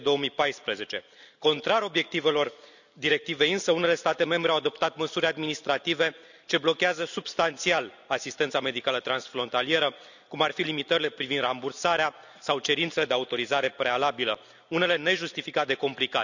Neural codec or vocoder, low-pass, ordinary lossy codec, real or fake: none; 7.2 kHz; none; real